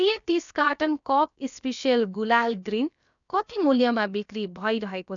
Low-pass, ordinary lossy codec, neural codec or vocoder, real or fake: 7.2 kHz; none; codec, 16 kHz, about 1 kbps, DyCAST, with the encoder's durations; fake